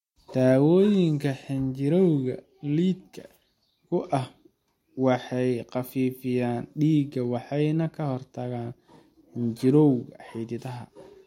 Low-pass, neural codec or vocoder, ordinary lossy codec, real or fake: 19.8 kHz; none; MP3, 64 kbps; real